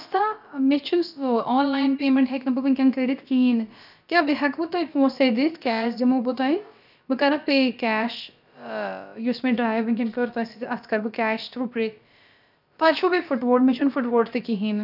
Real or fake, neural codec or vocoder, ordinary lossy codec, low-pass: fake; codec, 16 kHz, about 1 kbps, DyCAST, with the encoder's durations; none; 5.4 kHz